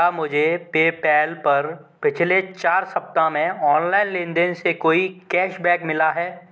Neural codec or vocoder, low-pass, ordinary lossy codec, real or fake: none; none; none; real